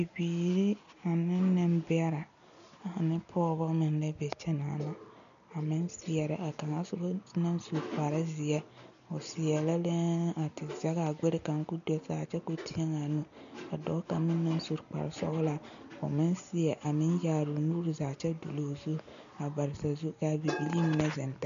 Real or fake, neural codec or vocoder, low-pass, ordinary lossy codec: real; none; 7.2 kHz; MP3, 96 kbps